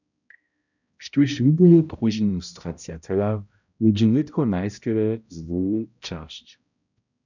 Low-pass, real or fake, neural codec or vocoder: 7.2 kHz; fake; codec, 16 kHz, 0.5 kbps, X-Codec, HuBERT features, trained on balanced general audio